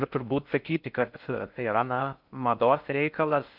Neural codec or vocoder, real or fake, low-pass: codec, 16 kHz in and 24 kHz out, 0.6 kbps, FocalCodec, streaming, 4096 codes; fake; 5.4 kHz